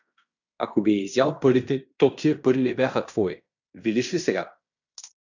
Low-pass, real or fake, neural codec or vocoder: 7.2 kHz; fake; codec, 16 kHz in and 24 kHz out, 0.9 kbps, LongCat-Audio-Codec, fine tuned four codebook decoder